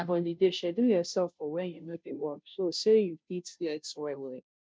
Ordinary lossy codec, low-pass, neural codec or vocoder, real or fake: none; none; codec, 16 kHz, 0.5 kbps, FunCodec, trained on Chinese and English, 25 frames a second; fake